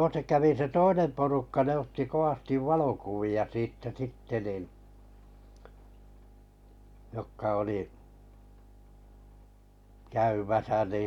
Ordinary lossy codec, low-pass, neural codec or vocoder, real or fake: none; 19.8 kHz; none; real